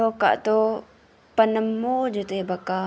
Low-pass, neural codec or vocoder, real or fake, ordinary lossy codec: none; none; real; none